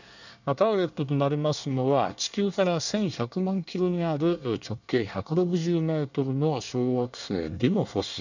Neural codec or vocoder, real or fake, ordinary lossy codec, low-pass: codec, 24 kHz, 1 kbps, SNAC; fake; none; 7.2 kHz